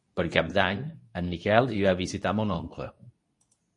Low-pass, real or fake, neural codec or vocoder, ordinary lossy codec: 10.8 kHz; fake; codec, 24 kHz, 0.9 kbps, WavTokenizer, medium speech release version 2; MP3, 48 kbps